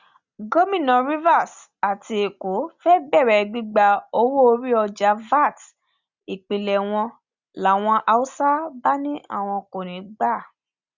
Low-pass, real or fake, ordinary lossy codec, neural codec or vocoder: 7.2 kHz; real; Opus, 64 kbps; none